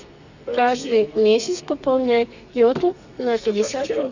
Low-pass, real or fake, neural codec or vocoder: 7.2 kHz; fake; codec, 32 kHz, 1.9 kbps, SNAC